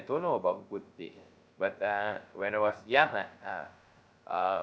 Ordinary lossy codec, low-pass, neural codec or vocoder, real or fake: none; none; codec, 16 kHz, 0.3 kbps, FocalCodec; fake